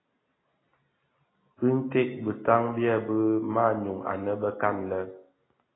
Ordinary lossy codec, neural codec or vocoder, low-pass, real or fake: AAC, 16 kbps; none; 7.2 kHz; real